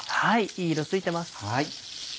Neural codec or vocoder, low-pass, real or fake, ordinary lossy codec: none; none; real; none